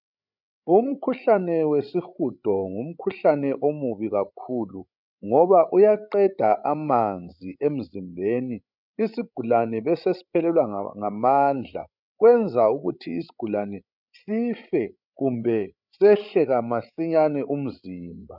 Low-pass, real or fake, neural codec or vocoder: 5.4 kHz; fake; codec, 16 kHz, 16 kbps, FreqCodec, larger model